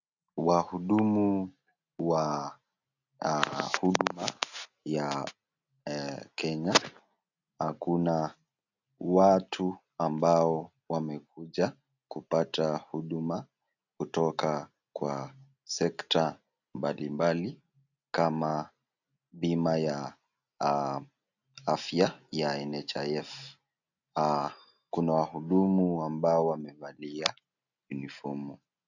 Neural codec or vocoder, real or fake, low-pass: none; real; 7.2 kHz